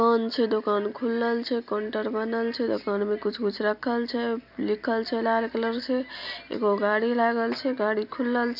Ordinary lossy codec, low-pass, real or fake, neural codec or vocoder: none; 5.4 kHz; real; none